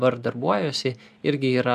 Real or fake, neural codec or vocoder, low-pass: real; none; 14.4 kHz